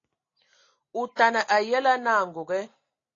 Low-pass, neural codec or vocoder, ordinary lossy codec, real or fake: 7.2 kHz; none; AAC, 32 kbps; real